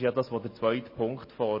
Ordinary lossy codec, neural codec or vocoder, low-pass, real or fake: MP3, 24 kbps; none; 5.4 kHz; real